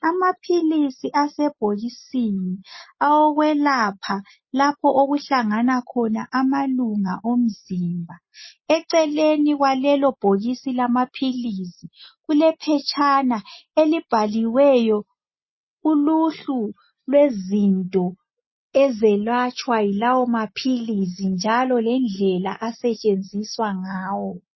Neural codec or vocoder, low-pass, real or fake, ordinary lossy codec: none; 7.2 kHz; real; MP3, 24 kbps